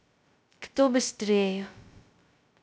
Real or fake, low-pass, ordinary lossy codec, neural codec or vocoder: fake; none; none; codec, 16 kHz, 0.2 kbps, FocalCodec